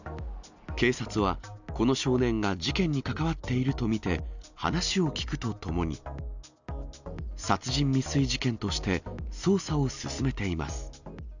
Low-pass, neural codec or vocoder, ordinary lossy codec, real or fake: 7.2 kHz; none; none; real